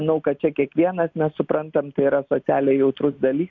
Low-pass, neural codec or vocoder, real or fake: 7.2 kHz; none; real